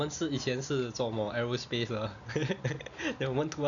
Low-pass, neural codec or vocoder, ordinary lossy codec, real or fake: 7.2 kHz; none; none; real